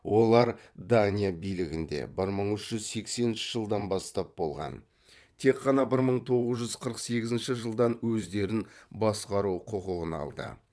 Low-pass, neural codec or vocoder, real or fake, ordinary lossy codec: none; vocoder, 22.05 kHz, 80 mel bands, WaveNeXt; fake; none